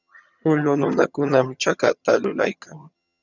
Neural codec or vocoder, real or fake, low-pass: vocoder, 22.05 kHz, 80 mel bands, HiFi-GAN; fake; 7.2 kHz